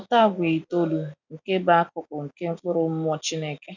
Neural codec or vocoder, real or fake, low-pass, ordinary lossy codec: none; real; 7.2 kHz; none